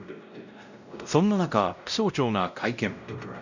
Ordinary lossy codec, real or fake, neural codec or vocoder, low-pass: none; fake; codec, 16 kHz, 0.5 kbps, X-Codec, WavLM features, trained on Multilingual LibriSpeech; 7.2 kHz